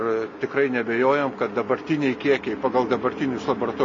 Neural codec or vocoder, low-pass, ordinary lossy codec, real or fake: none; 7.2 kHz; MP3, 32 kbps; real